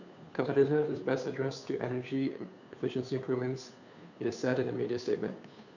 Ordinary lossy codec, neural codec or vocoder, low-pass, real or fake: none; codec, 16 kHz, 2 kbps, FunCodec, trained on Chinese and English, 25 frames a second; 7.2 kHz; fake